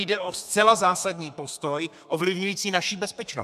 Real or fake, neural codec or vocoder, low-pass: fake; codec, 32 kHz, 1.9 kbps, SNAC; 14.4 kHz